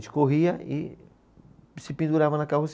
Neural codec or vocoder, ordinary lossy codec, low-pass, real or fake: none; none; none; real